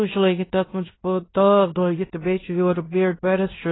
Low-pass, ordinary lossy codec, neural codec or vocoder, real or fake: 7.2 kHz; AAC, 16 kbps; codec, 16 kHz in and 24 kHz out, 0.9 kbps, LongCat-Audio-Codec, fine tuned four codebook decoder; fake